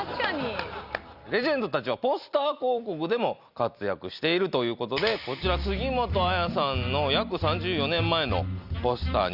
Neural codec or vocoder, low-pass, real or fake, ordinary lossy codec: none; 5.4 kHz; real; Opus, 64 kbps